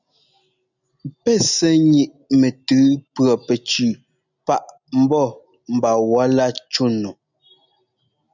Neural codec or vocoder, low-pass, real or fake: none; 7.2 kHz; real